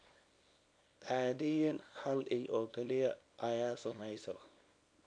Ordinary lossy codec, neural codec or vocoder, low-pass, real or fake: none; codec, 24 kHz, 0.9 kbps, WavTokenizer, small release; 9.9 kHz; fake